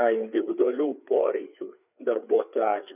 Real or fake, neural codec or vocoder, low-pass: fake; codec, 16 kHz, 4 kbps, FreqCodec, larger model; 3.6 kHz